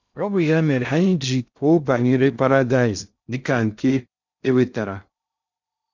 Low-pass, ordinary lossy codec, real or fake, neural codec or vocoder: 7.2 kHz; none; fake; codec, 16 kHz in and 24 kHz out, 0.6 kbps, FocalCodec, streaming, 2048 codes